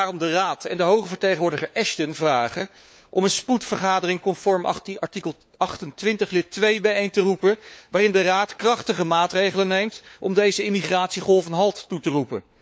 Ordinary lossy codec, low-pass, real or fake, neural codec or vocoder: none; none; fake; codec, 16 kHz, 4 kbps, FunCodec, trained on LibriTTS, 50 frames a second